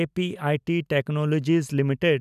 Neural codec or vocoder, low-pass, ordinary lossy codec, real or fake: none; 14.4 kHz; none; real